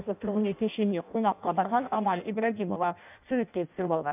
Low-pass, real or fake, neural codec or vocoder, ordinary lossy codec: 3.6 kHz; fake; codec, 16 kHz in and 24 kHz out, 0.6 kbps, FireRedTTS-2 codec; none